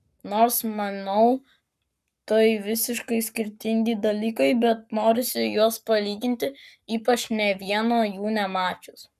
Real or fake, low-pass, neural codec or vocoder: fake; 14.4 kHz; codec, 44.1 kHz, 7.8 kbps, Pupu-Codec